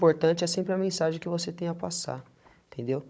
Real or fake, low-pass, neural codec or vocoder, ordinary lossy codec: fake; none; codec, 16 kHz, 16 kbps, FunCodec, trained on Chinese and English, 50 frames a second; none